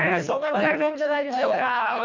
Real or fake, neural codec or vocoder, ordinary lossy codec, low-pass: fake; codec, 24 kHz, 1.5 kbps, HILCodec; MP3, 48 kbps; 7.2 kHz